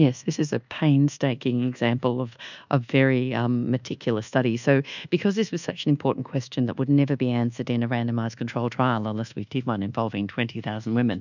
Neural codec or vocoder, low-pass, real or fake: codec, 24 kHz, 1.2 kbps, DualCodec; 7.2 kHz; fake